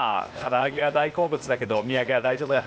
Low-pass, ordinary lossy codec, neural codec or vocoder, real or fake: none; none; codec, 16 kHz, 0.8 kbps, ZipCodec; fake